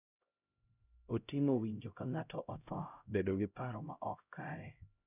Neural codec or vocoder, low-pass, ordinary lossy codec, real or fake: codec, 16 kHz, 0.5 kbps, X-Codec, HuBERT features, trained on LibriSpeech; 3.6 kHz; none; fake